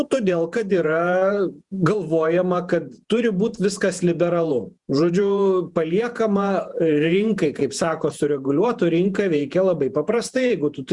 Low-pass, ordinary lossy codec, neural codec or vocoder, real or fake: 10.8 kHz; Opus, 64 kbps; vocoder, 48 kHz, 128 mel bands, Vocos; fake